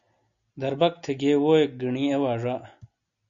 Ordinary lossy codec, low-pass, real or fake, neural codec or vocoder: MP3, 96 kbps; 7.2 kHz; real; none